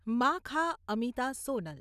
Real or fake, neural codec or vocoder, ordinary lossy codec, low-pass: real; none; none; 14.4 kHz